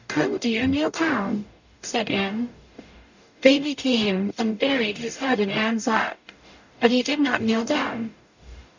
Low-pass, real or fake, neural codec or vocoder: 7.2 kHz; fake; codec, 44.1 kHz, 0.9 kbps, DAC